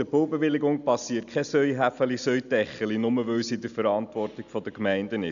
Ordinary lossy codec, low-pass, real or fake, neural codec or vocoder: none; 7.2 kHz; real; none